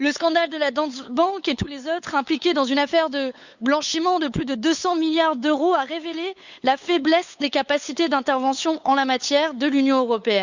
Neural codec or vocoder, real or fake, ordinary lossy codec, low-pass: codec, 16 kHz, 8 kbps, FunCodec, trained on LibriTTS, 25 frames a second; fake; Opus, 64 kbps; 7.2 kHz